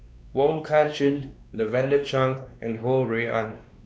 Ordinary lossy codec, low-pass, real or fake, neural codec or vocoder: none; none; fake; codec, 16 kHz, 2 kbps, X-Codec, WavLM features, trained on Multilingual LibriSpeech